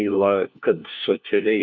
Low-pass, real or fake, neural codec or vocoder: 7.2 kHz; fake; codec, 16 kHz, 1 kbps, FunCodec, trained on LibriTTS, 50 frames a second